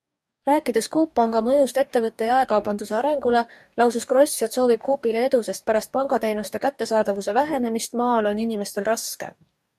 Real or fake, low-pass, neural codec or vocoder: fake; 14.4 kHz; codec, 44.1 kHz, 2.6 kbps, DAC